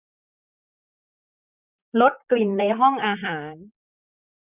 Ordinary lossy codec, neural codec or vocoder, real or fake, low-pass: none; vocoder, 44.1 kHz, 128 mel bands, Pupu-Vocoder; fake; 3.6 kHz